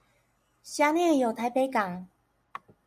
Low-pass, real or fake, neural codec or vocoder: 14.4 kHz; real; none